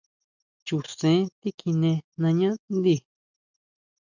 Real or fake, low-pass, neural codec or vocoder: real; 7.2 kHz; none